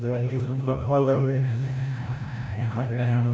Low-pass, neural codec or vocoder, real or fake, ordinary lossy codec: none; codec, 16 kHz, 0.5 kbps, FreqCodec, larger model; fake; none